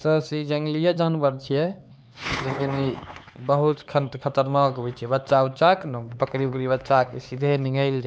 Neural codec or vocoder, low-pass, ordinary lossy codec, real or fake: codec, 16 kHz, 4 kbps, X-Codec, HuBERT features, trained on LibriSpeech; none; none; fake